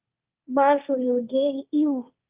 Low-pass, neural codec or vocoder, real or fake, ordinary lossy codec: 3.6 kHz; codec, 16 kHz, 1.1 kbps, Voila-Tokenizer; fake; Opus, 32 kbps